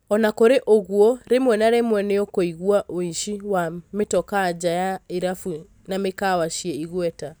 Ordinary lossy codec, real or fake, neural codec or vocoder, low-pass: none; real; none; none